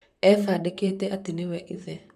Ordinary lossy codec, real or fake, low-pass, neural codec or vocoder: none; fake; 14.4 kHz; vocoder, 44.1 kHz, 128 mel bands, Pupu-Vocoder